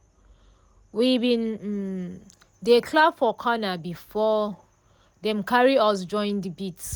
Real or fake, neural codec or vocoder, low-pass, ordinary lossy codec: real; none; none; none